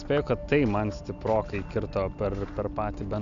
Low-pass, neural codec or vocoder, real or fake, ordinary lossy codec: 7.2 kHz; none; real; Opus, 64 kbps